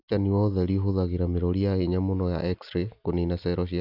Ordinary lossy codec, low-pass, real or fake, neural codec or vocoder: AAC, 48 kbps; 5.4 kHz; real; none